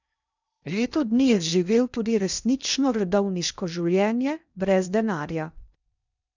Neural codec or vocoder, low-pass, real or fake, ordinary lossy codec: codec, 16 kHz in and 24 kHz out, 0.6 kbps, FocalCodec, streaming, 2048 codes; 7.2 kHz; fake; none